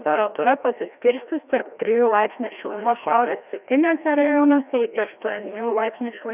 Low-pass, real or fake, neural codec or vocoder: 3.6 kHz; fake; codec, 16 kHz, 1 kbps, FreqCodec, larger model